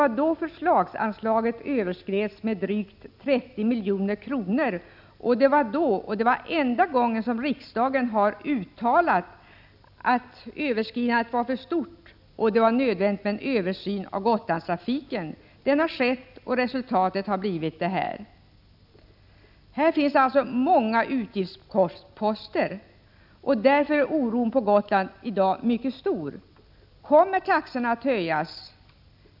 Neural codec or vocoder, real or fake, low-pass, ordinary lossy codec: none; real; 5.4 kHz; none